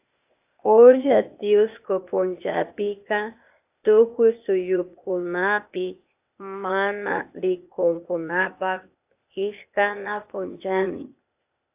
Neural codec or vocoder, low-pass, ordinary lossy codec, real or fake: codec, 16 kHz, 0.8 kbps, ZipCodec; 3.6 kHz; AAC, 32 kbps; fake